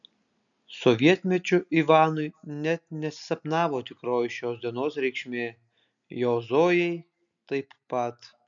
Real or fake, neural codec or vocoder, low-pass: real; none; 7.2 kHz